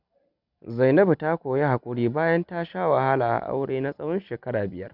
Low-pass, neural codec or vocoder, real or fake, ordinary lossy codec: 5.4 kHz; none; real; none